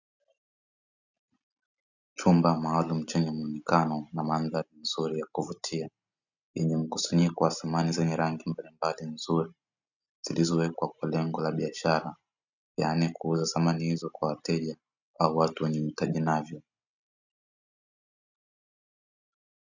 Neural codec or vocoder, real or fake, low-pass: none; real; 7.2 kHz